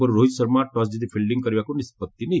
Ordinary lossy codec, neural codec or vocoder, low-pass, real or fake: none; none; none; real